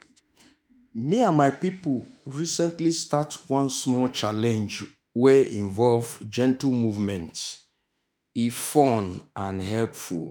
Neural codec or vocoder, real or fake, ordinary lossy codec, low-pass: autoencoder, 48 kHz, 32 numbers a frame, DAC-VAE, trained on Japanese speech; fake; none; none